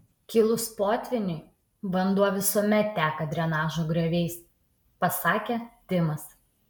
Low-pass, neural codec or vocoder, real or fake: 19.8 kHz; none; real